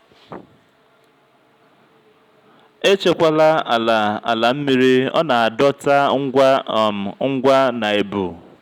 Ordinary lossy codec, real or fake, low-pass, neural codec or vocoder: none; real; 19.8 kHz; none